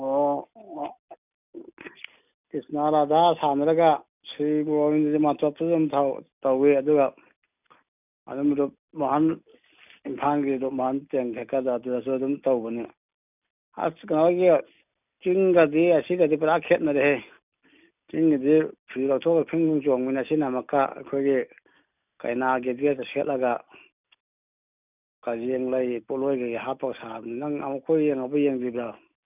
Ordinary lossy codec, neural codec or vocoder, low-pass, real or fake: none; none; 3.6 kHz; real